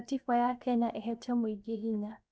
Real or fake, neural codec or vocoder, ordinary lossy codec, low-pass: fake; codec, 16 kHz, 0.7 kbps, FocalCodec; none; none